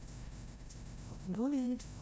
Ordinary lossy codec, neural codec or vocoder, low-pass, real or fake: none; codec, 16 kHz, 0.5 kbps, FreqCodec, larger model; none; fake